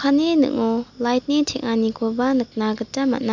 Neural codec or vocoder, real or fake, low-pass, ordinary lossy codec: none; real; 7.2 kHz; MP3, 64 kbps